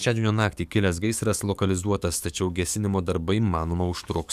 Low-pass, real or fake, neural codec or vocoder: 14.4 kHz; fake; codec, 44.1 kHz, 7.8 kbps, DAC